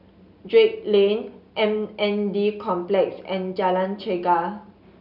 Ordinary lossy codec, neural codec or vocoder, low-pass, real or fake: none; none; 5.4 kHz; real